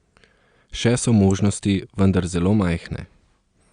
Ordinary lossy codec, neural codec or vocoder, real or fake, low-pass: Opus, 64 kbps; none; real; 9.9 kHz